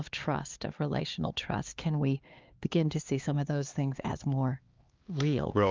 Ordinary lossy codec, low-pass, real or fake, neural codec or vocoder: Opus, 32 kbps; 7.2 kHz; fake; codec, 16 kHz, 2 kbps, X-Codec, HuBERT features, trained on LibriSpeech